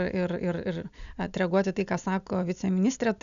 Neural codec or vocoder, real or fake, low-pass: none; real; 7.2 kHz